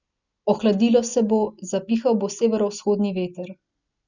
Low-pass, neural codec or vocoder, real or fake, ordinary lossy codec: 7.2 kHz; none; real; none